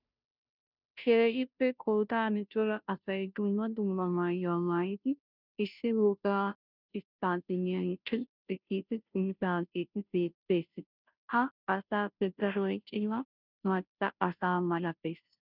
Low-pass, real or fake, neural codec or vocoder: 5.4 kHz; fake; codec, 16 kHz, 0.5 kbps, FunCodec, trained on Chinese and English, 25 frames a second